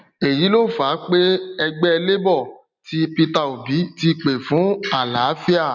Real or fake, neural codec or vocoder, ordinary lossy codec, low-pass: real; none; none; 7.2 kHz